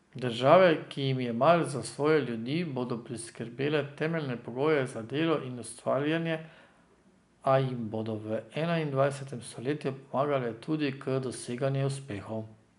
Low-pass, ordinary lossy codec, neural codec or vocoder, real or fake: 10.8 kHz; none; none; real